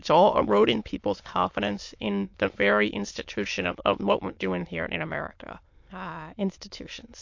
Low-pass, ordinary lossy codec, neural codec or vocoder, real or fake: 7.2 kHz; MP3, 48 kbps; autoencoder, 22.05 kHz, a latent of 192 numbers a frame, VITS, trained on many speakers; fake